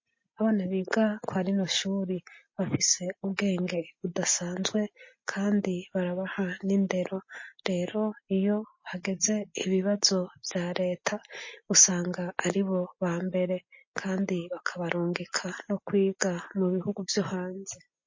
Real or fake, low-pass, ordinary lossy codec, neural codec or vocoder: real; 7.2 kHz; MP3, 32 kbps; none